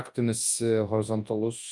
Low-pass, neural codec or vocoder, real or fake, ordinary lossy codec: 10.8 kHz; codec, 24 kHz, 0.9 kbps, WavTokenizer, large speech release; fake; Opus, 32 kbps